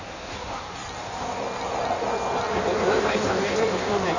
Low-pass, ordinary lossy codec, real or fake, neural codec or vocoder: 7.2 kHz; none; fake; codec, 16 kHz in and 24 kHz out, 1.1 kbps, FireRedTTS-2 codec